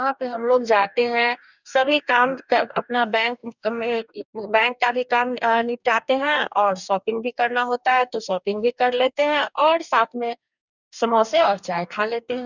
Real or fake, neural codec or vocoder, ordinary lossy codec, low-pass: fake; codec, 44.1 kHz, 2.6 kbps, DAC; none; 7.2 kHz